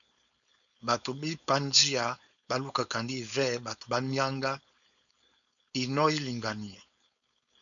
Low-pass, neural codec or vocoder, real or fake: 7.2 kHz; codec, 16 kHz, 4.8 kbps, FACodec; fake